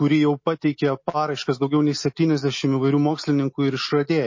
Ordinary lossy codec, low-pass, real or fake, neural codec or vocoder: MP3, 32 kbps; 7.2 kHz; real; none